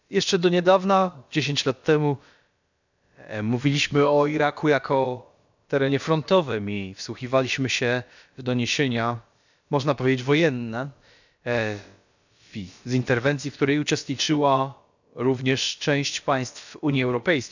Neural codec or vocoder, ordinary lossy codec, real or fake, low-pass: codec, 16 kHz, about 1 kbps, DyCAST, with the encoder's durations; none; fake; 7.2 kHz